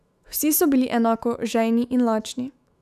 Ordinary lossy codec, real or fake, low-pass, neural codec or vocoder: none; fake; 14.4 kHz; autoencoder, 48 kHz, 128 numbers a frame, DAC-VAE, trained on Japanese speech